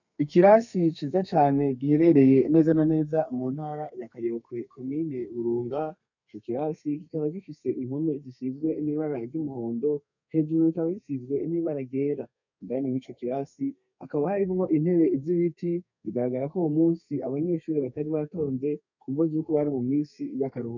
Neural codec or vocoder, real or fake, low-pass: codec, 32 kHz, 1.9 kbps, SNAC; fake; 7.2 kHz